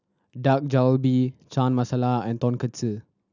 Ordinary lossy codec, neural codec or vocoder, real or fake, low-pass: none; none; real; 7.2 kHz